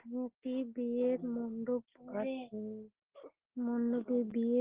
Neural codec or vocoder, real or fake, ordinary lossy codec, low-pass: none; real; Opus, 16 kbps; 3.6 kHz